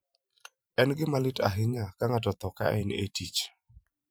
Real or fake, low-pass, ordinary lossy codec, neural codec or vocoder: fake; none; none; vocoder, 44.1 kHz, 128 mel bands every 512 samples, BigVGAN v2